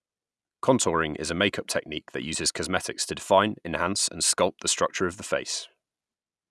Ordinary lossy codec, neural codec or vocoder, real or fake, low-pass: none; none; real; none